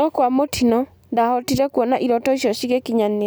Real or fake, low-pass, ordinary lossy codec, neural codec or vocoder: real; none; none; none